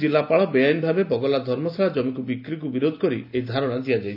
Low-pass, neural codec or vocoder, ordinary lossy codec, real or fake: 5.4 kHz; none; AAC, 48 kbps; real